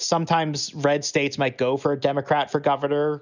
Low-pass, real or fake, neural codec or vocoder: 7.2 kHz; real; none